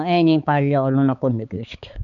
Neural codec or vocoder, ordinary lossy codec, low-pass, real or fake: codec, 16 kHz, 4 kbps, X-Codec, HuBERT features, trained on balanced general audio; MP3, 96 kbps; 7.2 kHz; fake